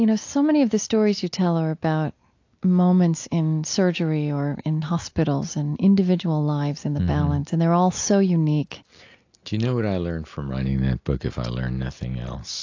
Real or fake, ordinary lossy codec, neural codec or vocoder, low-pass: real; AAC, 48 kbps; none; 7.2 kHz